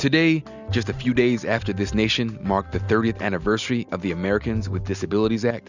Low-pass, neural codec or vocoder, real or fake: 7.2 kHz; none; real